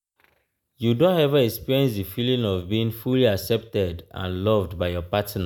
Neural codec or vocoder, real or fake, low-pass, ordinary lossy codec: none; real; none; none